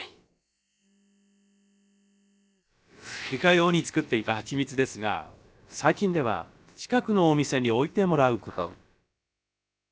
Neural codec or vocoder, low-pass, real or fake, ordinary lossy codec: codec, 16 kHz, about 1 kbps, DyCAST, with the encoder's durations; none; fake; none